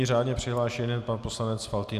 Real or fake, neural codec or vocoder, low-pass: real; none; 14.4 kHz